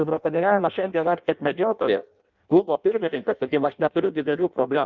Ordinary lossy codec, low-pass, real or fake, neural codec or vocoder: Opus, 24 kbps; 7.2 kHz; fake; codec, 16 kHz in and 24 kHz out, 0.6 kbps, FireRedTTS-2 codec